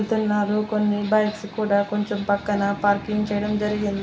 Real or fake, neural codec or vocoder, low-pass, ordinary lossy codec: real; none; none; none